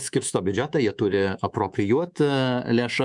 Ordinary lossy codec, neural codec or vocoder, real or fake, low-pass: MP3, 96 kbps; codec, 24 kHz, 3.1 kbps, DualCodec; fake; 10.8 kHz